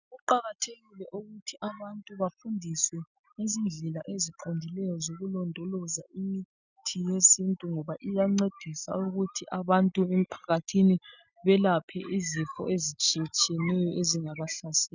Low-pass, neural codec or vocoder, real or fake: 7.2 kHz; none; real